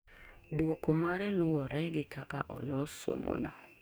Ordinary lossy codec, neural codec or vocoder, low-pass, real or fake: none; codec, 44.1 kHz, 2.6 kbps, DAC; none; fake